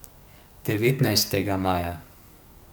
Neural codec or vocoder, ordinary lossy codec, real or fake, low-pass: codec, 44.1 kHz, 2.6 kbps, SNAC; none; fake; none